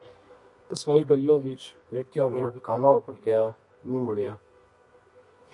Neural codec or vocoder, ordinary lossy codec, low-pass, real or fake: codec, 24 kHz, 0.9 kbps, WavTokenizer, medium music audio release; MP3, 48 kbps; 10.8 kHz; fake